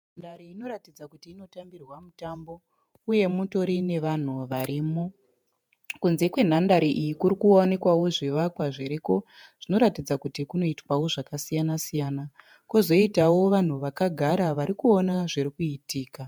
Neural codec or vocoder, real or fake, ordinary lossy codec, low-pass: vocoder, 48 kHz, 128 mel bands, Vocos; fake; MP3, 96 kbps; 19.8 kHz